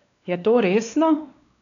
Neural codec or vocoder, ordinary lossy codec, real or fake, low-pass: codec, 16 kHz, 2 kbps, X-Codec, WavLM features, trained on Multilingual LibriSpeech; none; fake; 7.2 kHz